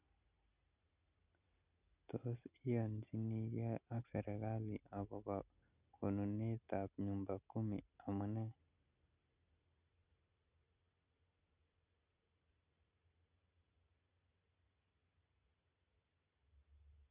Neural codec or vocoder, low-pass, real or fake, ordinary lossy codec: none; 3.6 kHz; real; none